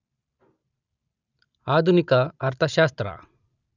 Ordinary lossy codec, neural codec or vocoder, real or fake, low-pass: none; none; real; 7.2 kHz